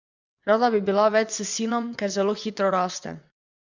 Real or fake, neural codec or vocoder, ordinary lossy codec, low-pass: fake; vocoder, 22.05 kHz, 80 mel bands, WaveNeXt; Opus, 64 kbps; 7.2 kHz